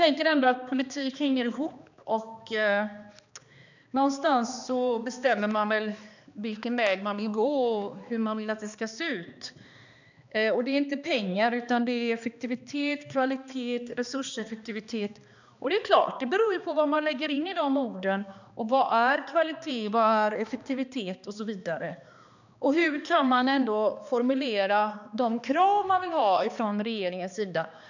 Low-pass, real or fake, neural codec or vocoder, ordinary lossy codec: 7.2 kHz; fake; codec, 16 kHz, 2 kbps, X-Codec, HuBERT features, trained on balanced general audio; none